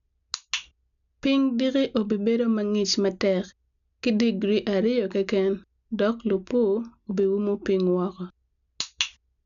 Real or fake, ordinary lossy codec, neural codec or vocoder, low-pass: real; none; none; 7.2 kHz